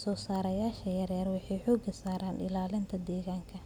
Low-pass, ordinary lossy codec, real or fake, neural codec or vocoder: 19.8 kHz; none; real; none